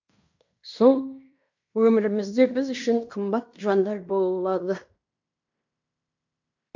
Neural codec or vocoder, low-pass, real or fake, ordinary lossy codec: codec, 16 kHz in and 24 kHz out, 0.9 kbps, LongCat-Audio-Codec, fine tuned four codebook decoder; 7.2 kHz; fake; MP3, 64 kbps